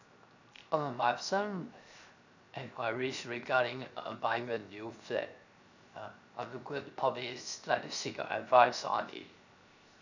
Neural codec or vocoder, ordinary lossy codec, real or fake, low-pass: codec, 16 kHz, 0.7 kbps, FocalCodec; none; fake; 7.2 kHz